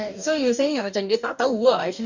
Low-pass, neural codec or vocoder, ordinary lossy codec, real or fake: 7.2 kHz; codec, 44.1 kHz, 2.6 kbps, DAC; none; fake